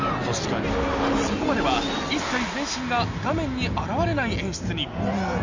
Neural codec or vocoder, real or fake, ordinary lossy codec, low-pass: none; real; MP3, 64 kbps; 7.2 kHz